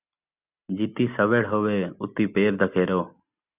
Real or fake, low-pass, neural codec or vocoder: real; 3.6 kHz; none